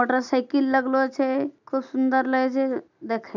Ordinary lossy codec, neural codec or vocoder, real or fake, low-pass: none; none; real; 7.2 kHz